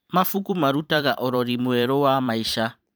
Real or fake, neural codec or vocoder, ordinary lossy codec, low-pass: fake; vocoder, 44.1 kHz, 128 mel bands every 512 samples, BigVGAN v2; none; none